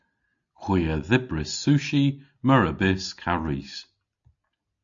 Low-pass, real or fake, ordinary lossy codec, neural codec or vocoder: 7.2 kHz; real; AAC, 64 kbps; none